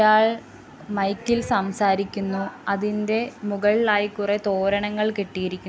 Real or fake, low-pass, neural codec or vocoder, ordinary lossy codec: real; none; none; none